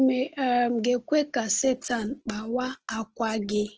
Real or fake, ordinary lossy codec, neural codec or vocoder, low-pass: real; Opus, 16 kbps; none; 7.2 kHz